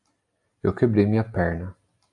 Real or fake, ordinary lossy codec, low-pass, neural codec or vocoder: real; AAC, 64 kbps; 10.8 kHz; none